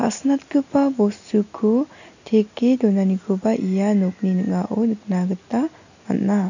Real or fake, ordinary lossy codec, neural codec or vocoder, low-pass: real; none; none; 7.2 kHz